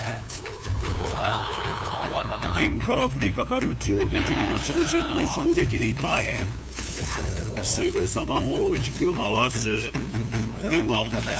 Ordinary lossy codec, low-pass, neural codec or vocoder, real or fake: none; none; codec, 16 kHz, 2 kbps, FunCodec, trained on LibriTTS, 25 frames a second; fake